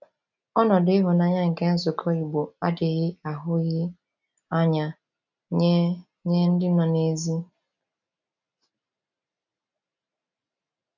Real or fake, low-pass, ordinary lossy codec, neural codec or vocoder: real; 7.2 kHz; none; none